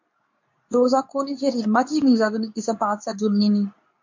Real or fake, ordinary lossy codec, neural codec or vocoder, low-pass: fake; MP3, 48 kbps; codec, 24 kHz, 0.9 kbps, WavTokenizer, medium speech release version 2; 7.2 kHz